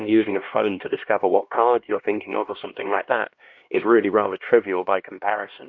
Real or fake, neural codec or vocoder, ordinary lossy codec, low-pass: fake; codec, 16 kHz, 1 kbps, X-Codec, HuBERT features, trained on LibriSpeech; MP3, 48 kbps; 7.2 kHz